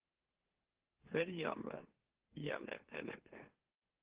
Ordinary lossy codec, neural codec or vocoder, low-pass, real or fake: Opus, 16 kbps; autoencoder, 44.1 kHz, a latent of 192 numbers a frame, MeloTTS; 3.6 kHz; fake